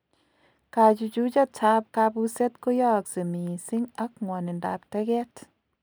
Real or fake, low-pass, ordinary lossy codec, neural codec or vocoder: real; none; none; none